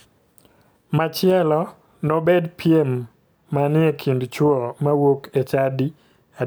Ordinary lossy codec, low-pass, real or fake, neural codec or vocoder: none; none; real; none